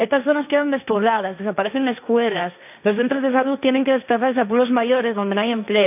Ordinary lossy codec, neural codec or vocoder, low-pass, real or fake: none; codec, 16 kHz, 1.1 kbps, Voila-Tokenizer; 3.6 kHz; fake